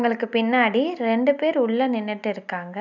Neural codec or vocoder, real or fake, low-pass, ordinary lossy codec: none; real; 7.2 kHz; none